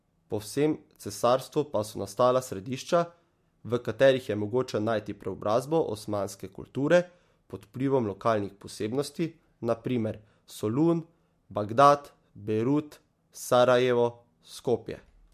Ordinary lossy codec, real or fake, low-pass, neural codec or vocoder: MP3, 64 kbps; real; 14.4 kHz; none